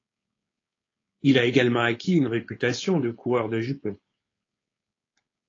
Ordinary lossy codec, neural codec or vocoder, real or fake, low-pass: AAC, 32 kbps; codec, 16 kHz, 4.8 kbps, FACodec; fake; 7.2 kHz